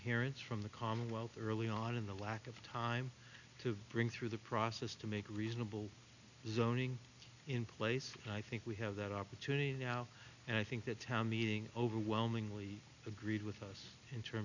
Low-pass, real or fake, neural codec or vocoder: 7.2 kHz; real; none